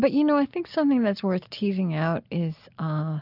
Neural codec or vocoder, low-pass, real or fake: none; 5.4 kHz; real